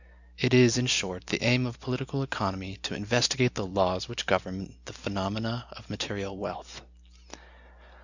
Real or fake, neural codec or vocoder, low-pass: real; none; 7.2 kHz